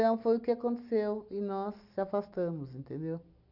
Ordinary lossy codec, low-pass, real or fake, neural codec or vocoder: none; 5.4 kHz; real; none